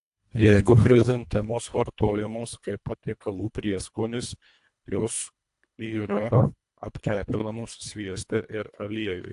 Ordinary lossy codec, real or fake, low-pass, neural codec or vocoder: AAC, 64 kbps; fake; 10.8 kHz; codec, 24 kHz, 1.5 kbps, HILCodec